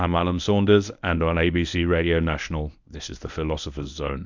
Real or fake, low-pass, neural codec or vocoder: fake; 7.2 kHz; codec, 24 kHz, 0.9 kbps, WavTokenizer, medium speech release version 1